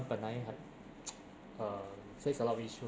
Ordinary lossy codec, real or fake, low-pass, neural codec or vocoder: none; real; none; none